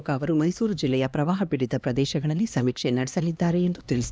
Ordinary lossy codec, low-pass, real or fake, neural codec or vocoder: none; none; fake; codec, 16 kHz, 2 kbps, X-Codec, HuBERT features, trained on LibriSpeech